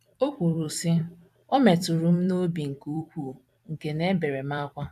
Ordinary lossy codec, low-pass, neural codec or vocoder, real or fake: AAC, 96 kbps; 14.4 kHz; vocoder, 44.1 kHz, 128 mel bands every 256 samples, BigVGAN v2; fake